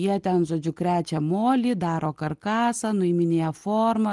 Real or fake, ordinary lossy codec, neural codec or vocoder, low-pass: real; Opus, 24 kbps; none; 10.8 kHz